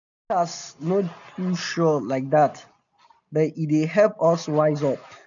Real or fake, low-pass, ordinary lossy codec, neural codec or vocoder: real; 7.2 kHz; none; none